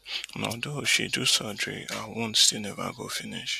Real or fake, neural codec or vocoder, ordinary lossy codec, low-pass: real; none; none; 14.4 kHz